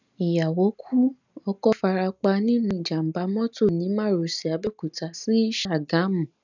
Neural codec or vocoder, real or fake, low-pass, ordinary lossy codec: none; real; 7.2 kHz; none